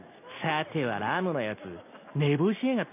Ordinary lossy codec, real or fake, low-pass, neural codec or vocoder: none; real; 3.6 kHz; none